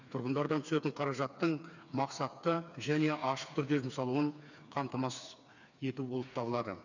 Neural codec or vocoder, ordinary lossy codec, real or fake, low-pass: codec, 16 kHz, 4 kbps, FreqCodec, smaller model; none; fake; 7.2 kHz